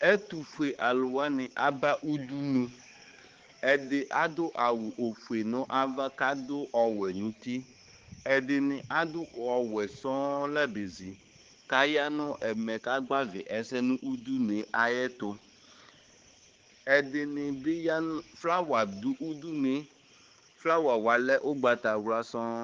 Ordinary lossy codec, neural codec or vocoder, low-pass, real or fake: Opus, 16 kbps; codec, 16 kHz, 4 kbps, X-Codec, HuBERT features, trained on balanced general audio; 7.2 kHz; fake